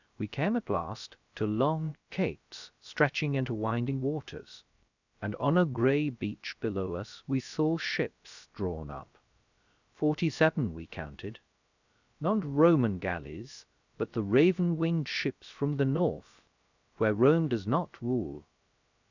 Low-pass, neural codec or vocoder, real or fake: 7.2 kHz; codec, 16 kHz, 0.3 kbps, FocalCodec; fake